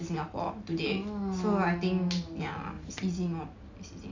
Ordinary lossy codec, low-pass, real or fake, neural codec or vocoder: MP3, 64 kbps; 7.2 kHz; real; none